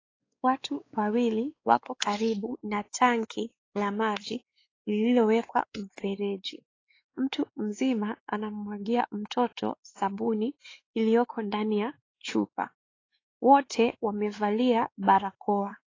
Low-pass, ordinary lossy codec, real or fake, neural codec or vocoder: 7.2 kHz; AAC, 32 kbps; fake; codec, 16 kHz, 4 kbps, X-Codec, WavLM features, trained on Multilingual LibriSpeech